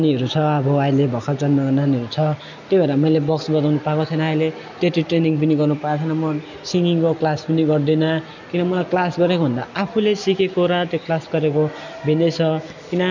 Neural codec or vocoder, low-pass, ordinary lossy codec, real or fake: none; 7.2 kHz; none; real